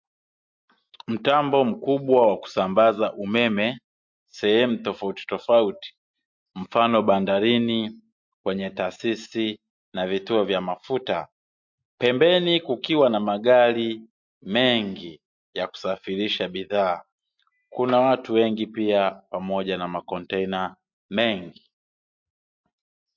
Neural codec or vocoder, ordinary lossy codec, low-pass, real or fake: none; MP3, 48 kbps; 7.2 kHz; real